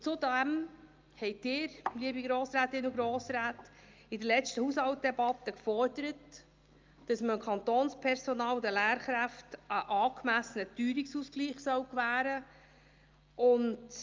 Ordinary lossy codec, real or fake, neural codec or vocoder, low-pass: Opus, 24 kbps; real; none; 7.2 kHz